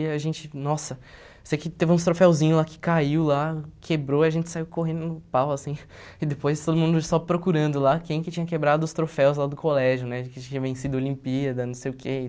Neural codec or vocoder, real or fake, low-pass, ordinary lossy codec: none; real; none; none